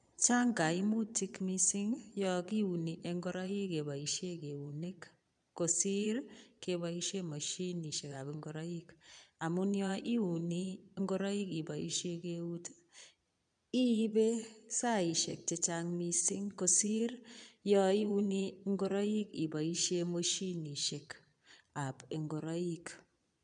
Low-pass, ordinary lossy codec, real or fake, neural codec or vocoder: 9.9 kHz; none; fake; vocoder, 22.05 kHz, 80 mel bands, Vocos